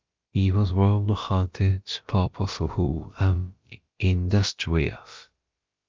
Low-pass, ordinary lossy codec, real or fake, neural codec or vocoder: 7.2 kHz; Opus, 24 kbps; fake; codec, 16 kHz, about 1 kbps, DyCAST, with the encoder's durations